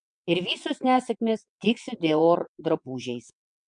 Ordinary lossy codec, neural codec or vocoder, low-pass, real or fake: MP3, 64 kbps; vocoder, 22.05 kHz, 80 mel bands, WaveNeXt; 9.9 kHz; fake